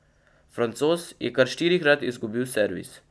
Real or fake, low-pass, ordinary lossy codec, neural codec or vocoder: real; none; none; none